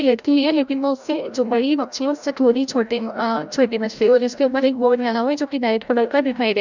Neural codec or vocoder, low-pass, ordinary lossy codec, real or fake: codec, 16 kHz, 0.5 kbps, FreqCodec, larger model; 7.2 kHz; none; fake